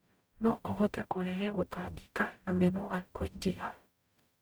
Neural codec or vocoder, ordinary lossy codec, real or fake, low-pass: codec, 44.1 kHz, 0.9 kbps, DAC; none; fake; none